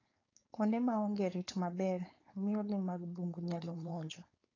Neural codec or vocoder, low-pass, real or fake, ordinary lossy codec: codec, 16 kHz, 4.8 kbps, FACodec; 7.2 kHz; fake; AAC, 32 kbps